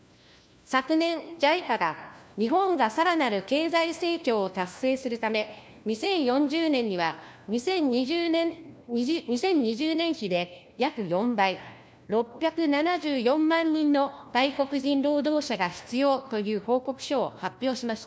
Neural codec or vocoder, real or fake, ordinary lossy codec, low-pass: codec, 16 kHz, 1 kbps, FunCodec, trained on LibriTTS, 50 frames a second; fake; none; none